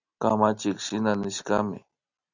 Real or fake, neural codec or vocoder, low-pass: real; none; 7.2 kHz